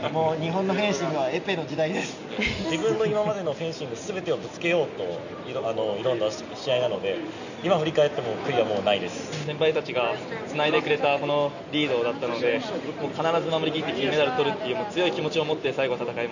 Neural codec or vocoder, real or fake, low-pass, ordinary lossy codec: none; real; 7.2 kHz; none